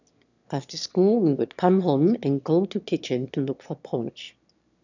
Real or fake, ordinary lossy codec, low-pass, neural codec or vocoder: fake; none; 7.2 kHz; autoencoder, 22.05 kHz, a latent of 192 numbers a frame, VITS, trained on one speaker